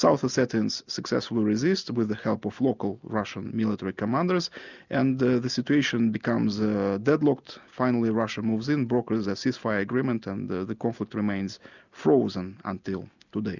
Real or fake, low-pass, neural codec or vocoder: real; 7.2 kHz; none